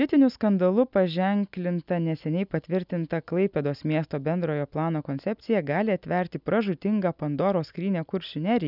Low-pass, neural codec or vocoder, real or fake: 5.4 kHz; none; real